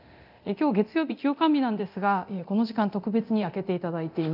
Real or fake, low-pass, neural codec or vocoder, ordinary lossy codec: fake; 5.4 kHz; codec, 24 kHz, 0.9 kbps, DualCodec; none